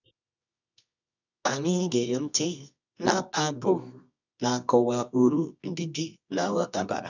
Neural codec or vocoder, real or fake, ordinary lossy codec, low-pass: codec, 24 kHz, 0.9 kbps, WavTokenizer, medium music audio release; fake; none; 7.2 kHz